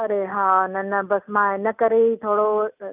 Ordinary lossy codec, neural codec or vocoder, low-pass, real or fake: none; none; 3.6 kHz; real